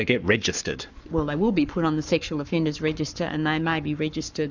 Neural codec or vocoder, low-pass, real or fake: codec, 44.1 kHz, 7.8 kbps, Pupu-Codec; 7.2 kHz; fake